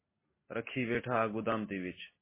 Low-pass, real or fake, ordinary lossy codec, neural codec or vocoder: 3.6 kHz; real; MP3, 16 kbps; none